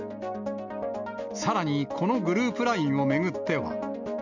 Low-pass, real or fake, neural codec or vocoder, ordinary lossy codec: 7.2 kHz; real; none; none